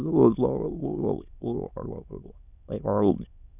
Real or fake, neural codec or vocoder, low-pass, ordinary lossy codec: fake; autoencoder, 22.05 kHz, a latent of 192 numbers a frame, VITS, trained on many speakers; 3.6 kHz; none